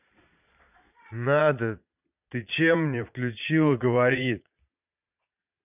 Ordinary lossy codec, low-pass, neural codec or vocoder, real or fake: none; 3.6 kHz; vocoder, 22.05 kHz, 80 mel bands, Vocos; fake